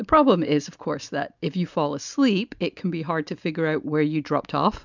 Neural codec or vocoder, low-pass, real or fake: none; 7.2 kHz; real